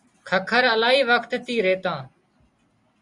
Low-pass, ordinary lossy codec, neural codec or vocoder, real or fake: 10.8 kHz; Opus, 64 kbps; none; real